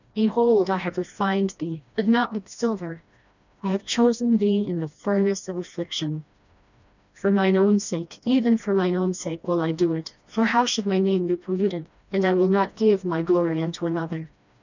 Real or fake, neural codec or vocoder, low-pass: fake; codec, 16 kHz, 1 kbps, FreqCodec, smaller model; 7.2 kHz